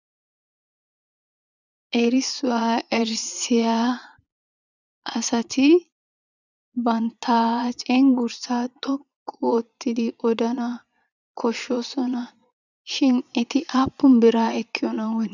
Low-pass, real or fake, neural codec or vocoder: 7.2 kHz; fake; vocoder, 22.05 kHz, 80 mel bands, Vocos